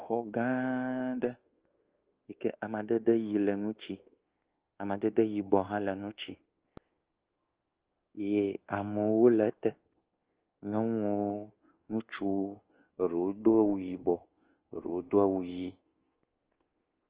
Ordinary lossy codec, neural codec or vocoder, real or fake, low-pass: Opus, 16 kbps; codec, 24 kHz, 1.2 kbps, DualCodec; fake; 3.6 kHz